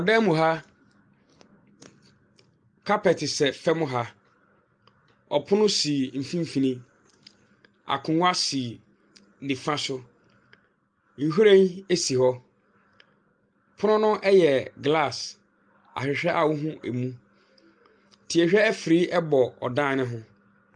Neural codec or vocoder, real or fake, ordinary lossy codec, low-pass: none; real; Opus, 32 kbps; 9.9 kHz